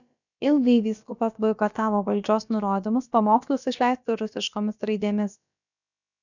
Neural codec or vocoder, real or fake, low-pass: codec, 16 kHz, about 1 kbps, DyCAST, with the encoder's durations; fake; 7.2 kHz